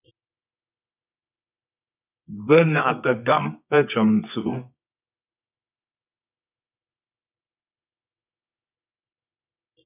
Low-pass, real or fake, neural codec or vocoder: 3.6 kHz; fake; codec, 24 kHz, 0.9 kbps, WavTokenizer, medium music audio release